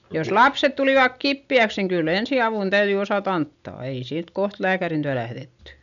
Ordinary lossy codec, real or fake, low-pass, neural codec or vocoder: none; real; 7.2 kHz; none